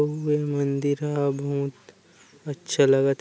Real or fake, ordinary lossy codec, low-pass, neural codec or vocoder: real; none; none; none